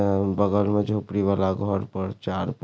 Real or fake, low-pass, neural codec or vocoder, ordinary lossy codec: real; none; none; none